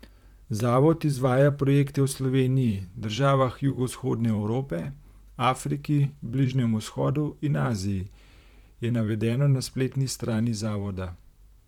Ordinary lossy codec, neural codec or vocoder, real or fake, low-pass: none; vocoder, 44.1 kHz, 128 mel bands, Pupu-Vocoder; fake; 19.8 kHz